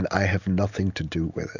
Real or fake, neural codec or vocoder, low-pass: real; none; 7.2 kHz